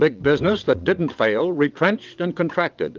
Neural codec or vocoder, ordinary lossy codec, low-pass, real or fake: vocoder, 22.05 kHz, 80 mel bands, WaveNeXt; Opus, 24 kbps; 7.2 kHz; fake